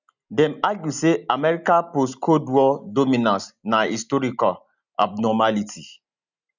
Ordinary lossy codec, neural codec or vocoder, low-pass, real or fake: none; none; 7.2 kHz; real